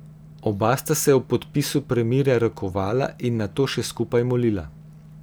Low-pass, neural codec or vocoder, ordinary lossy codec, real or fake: none; none; none; real